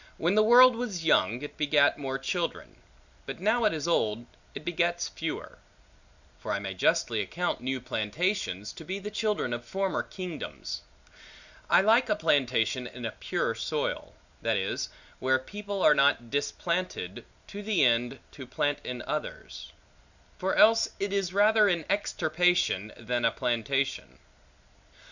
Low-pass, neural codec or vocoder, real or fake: 7.2 kHz; none; real